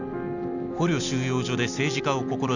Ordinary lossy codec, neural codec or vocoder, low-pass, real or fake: none; none; 7.2 kHz; real